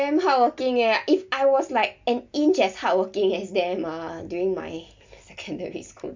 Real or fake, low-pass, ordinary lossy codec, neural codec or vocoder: real; 7.2 kHz; MP3, 64 kbps; none